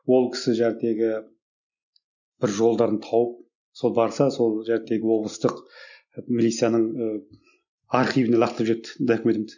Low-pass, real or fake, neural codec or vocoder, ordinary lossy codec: 7.2 kHz; real; none; none